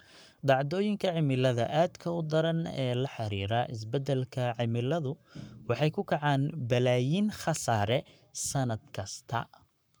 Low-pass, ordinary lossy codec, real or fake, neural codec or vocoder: none; none; fake; codec, 44.1 kHz, 7.8 kbps, Pupu-Codec